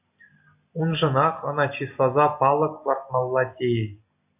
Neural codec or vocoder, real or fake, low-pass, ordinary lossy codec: none; real; 3.6 kHz; AAC, 32 kbps